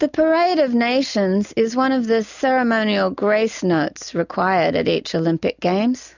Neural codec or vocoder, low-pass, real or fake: none; 7.2 kHz; real